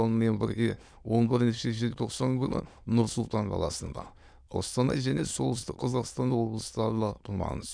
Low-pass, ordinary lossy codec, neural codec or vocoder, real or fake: 9.9 kHz; none; autoencoder, 22.05 kHz, a latent of 192 numbers a frame, VITS, trained on many speakers; fake